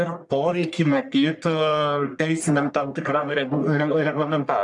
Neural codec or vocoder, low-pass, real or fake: codec, 44.1 kHz, 1.7 kbps, Pupu-Codec; 10.8 kHz; fake